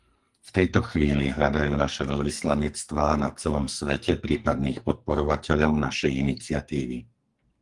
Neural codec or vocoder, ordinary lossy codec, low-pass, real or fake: codec, 44.1 kHz, 2.6 kbps, SNAC; Opus, 24 kbps; 10.8 kHz; fake